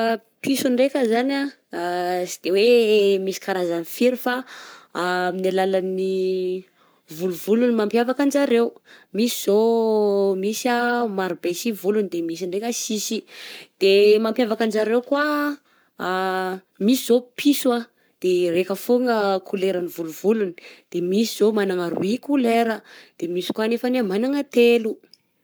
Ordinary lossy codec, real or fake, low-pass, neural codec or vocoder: none; fake; none; codec, 44.1 kHz, 7.8 kbps, Pupu-Codec